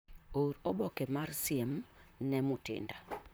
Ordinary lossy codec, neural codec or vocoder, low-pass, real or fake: none; vocoder, 44.1 kHz, 128 mel bands, Pupu-Vocoder; none; fake